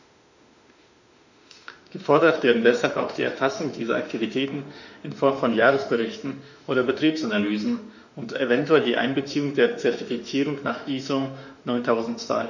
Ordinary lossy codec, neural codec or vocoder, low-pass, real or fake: none; autoencoder, 48 kHz, 32 numbers a frame, DAC-VAE, trained on Japanese speech; 7.2 kHz; fake